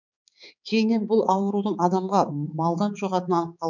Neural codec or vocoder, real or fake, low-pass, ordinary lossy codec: codec, 16 kHz, 4 kbps, X-Codec, HuBERT features, trained on balanced general audio; fake; 7.2 kHz; none